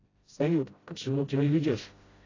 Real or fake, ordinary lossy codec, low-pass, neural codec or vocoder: fake; AAC, 32 kbps; 7.2 kHz; codec, 16 kHz, 0.5 kbps, FreqCodec, smaller model